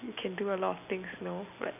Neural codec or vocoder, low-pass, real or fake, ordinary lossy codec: none; 3.6 kHz; real; none